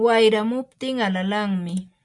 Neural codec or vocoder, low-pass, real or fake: none; 10.8 kHz; real